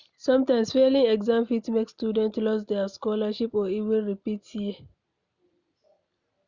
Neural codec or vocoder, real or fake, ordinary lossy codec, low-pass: none; real; Opus, 64 kbps; 7.2 kHz